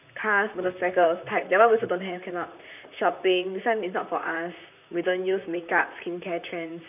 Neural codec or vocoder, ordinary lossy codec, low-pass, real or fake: vocoder, 44.1 kHz, 128 mel bands, Pupu-Vocoder; none; 3.6 kHz; fake